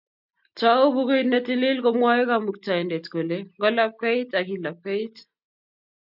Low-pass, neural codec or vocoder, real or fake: 5.4 kHz; none; real